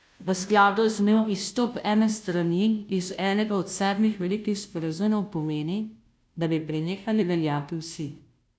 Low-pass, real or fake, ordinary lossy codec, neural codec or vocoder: none; fake; none; codec, 16 kHz, 0.5 kbps, FunCodec, trained on Chinese and English, 25 frames a second